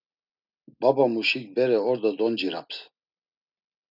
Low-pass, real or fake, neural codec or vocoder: 5.4 kHz; real; none